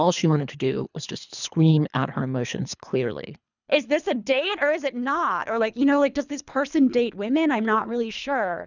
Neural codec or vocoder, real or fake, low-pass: codec, 24 kHz, 3 kbps, HILCodec; fake; 7.2 kHz